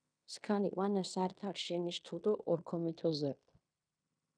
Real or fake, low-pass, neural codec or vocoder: fake; 9.9 kHz; codec, 16 kHz in and 24 kHz out, 0.9 kbps, LongCat-Audio-Codec, fine tuned four codebook decoder